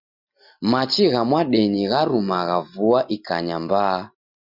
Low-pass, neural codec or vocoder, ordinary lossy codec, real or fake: 5.4 kHz; none; Opus, 64 kbps; real